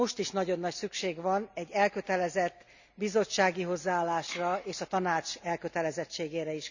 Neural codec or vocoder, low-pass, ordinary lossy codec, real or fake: none; 7.2 kHz; none; real